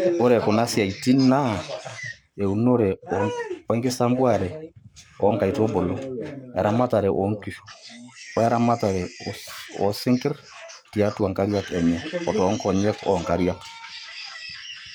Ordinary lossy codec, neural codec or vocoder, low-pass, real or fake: none; codec, 44.1 kHz, 7.8 kbps, Pupu-Codec; none; fake